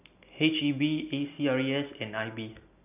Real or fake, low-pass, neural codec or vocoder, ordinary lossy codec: real; 3.6 kHz; none; none